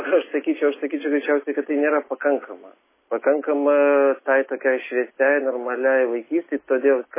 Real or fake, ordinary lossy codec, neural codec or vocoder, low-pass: real; MP3, 16 kbps; none; 3.6 kHz